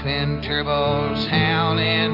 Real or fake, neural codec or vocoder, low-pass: real; none; 5.4 kHz